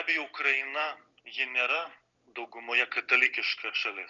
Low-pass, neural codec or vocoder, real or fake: 7.2 kHz; none; real